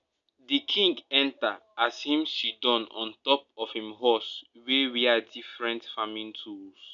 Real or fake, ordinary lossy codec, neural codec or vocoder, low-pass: real; none; none; 7.2 kHz